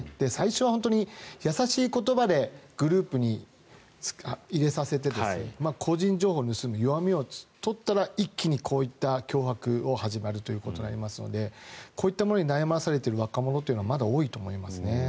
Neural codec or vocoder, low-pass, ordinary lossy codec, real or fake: none; none; none; real